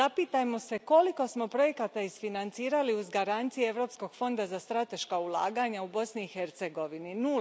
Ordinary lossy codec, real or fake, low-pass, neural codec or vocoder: none; real; none; none